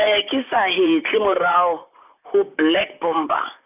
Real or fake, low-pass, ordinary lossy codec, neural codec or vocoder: fake; 3.6 kHz; none; vocoder, 44.1 kHz, 128 mel bands, Pupu-Vocoder